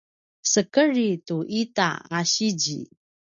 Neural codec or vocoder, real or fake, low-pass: none; real; 7.2 kHz